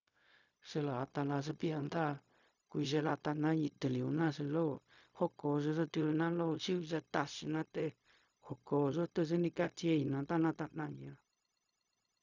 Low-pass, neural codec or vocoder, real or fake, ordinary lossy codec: 7.2 kHz; codec, 16 kHz, 0.4 kbps, LongCat-Audio-Codec; fake; none